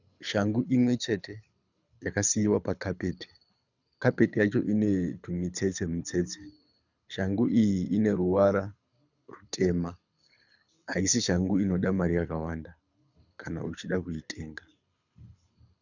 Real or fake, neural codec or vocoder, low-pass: fake; codec, 24 kHz, 6 kbps, HILCodec; 7.2 kHz